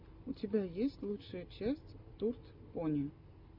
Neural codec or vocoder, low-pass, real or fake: none; 5.4 kHz; real